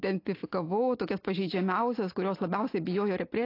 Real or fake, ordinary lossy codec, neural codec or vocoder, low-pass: real; AAC, 32 kbps; none; 5.4 kHz